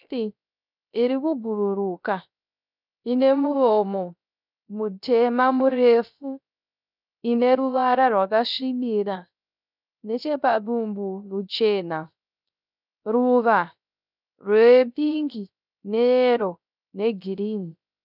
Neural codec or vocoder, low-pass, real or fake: codec, 16 kHz, 0.3 kbps, FocalCodec; 5.4 kHz; fake